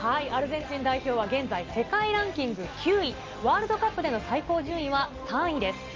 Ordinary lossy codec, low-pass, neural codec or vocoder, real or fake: Opus, 32 kbps; 7.2 kHz; none; real